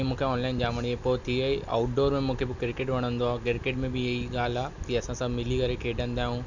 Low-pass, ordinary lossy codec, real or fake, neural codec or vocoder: 7.2 kHz; none; real; none